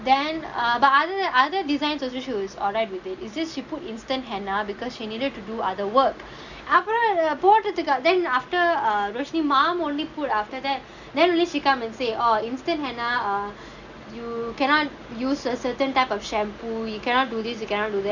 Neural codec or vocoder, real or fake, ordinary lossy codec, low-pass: none; real; none; 7.2 kHz